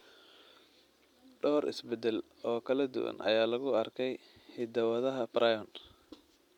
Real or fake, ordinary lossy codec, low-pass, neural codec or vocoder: real; none; 19.8 kHz; none